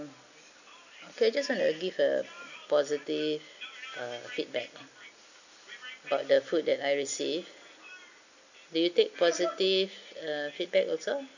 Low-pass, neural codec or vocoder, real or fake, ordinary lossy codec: 7.2 kHz; none; real; none